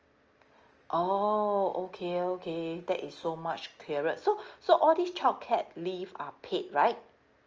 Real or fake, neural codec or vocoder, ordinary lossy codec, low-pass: real; none; Opus, 24 kbps; 7.2 kHz